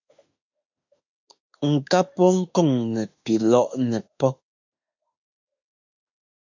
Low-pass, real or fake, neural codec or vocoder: 7.2 kHz; fake; autoencoder, 48 kHz, 32 numbers a frame, DAC-VAE, trained on Japanese speech